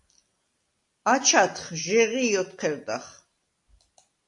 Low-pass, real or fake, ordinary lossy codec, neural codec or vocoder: 10.8 kHz; real; AAC, 48 kbps; none